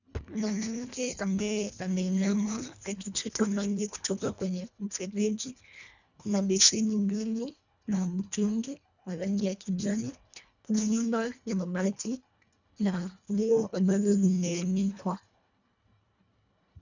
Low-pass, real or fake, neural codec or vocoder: 7.2 kHz; fake; codec, 24 kHz, 1.5 kbps, HILCodec